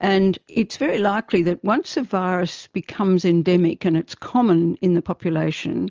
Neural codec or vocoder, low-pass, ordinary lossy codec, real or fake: none; 7.2 kHz; Opus, 24 kbps; real